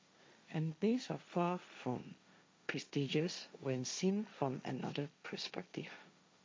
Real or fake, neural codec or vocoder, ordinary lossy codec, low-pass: fake; codec, 16 kHz, 1.1 kbps, Voila-Tokenizer; none; none